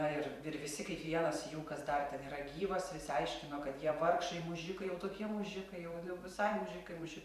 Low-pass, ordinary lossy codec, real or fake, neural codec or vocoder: 14.4 kHz; MP3, 96 kbps; fake; vocoder, 44.1 kHz, 128 mel bands every 512 samples, BigVGAN v2